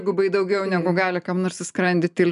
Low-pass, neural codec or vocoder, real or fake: 10.8 kHz; none; real